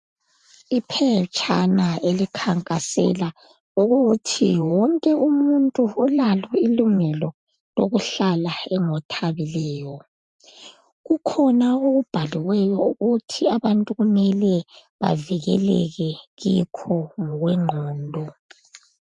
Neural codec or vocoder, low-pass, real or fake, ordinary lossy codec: vocoder, 44.1 kHz, 128 mel bands every 512 samples, BigVGAN v2; 10.8 kHz; fake; MP3, 64 kbps